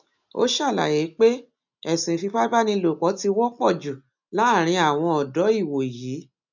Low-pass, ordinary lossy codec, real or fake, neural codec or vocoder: 7.2 kHz; none; real; none